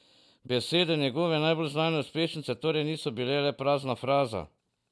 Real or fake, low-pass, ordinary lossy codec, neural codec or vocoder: real; none; none; none